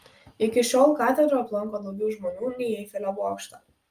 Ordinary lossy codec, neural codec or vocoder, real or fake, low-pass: Opus, 32 kbps; none; real; 14.4 kHz